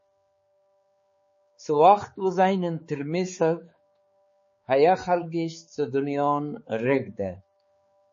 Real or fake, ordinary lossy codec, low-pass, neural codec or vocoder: fake; MP3, 32 kbps; 7.2 kHz; codec, 16 kHz, 4 kbps, X-Codec, HuBERT features, trained on balanced general audio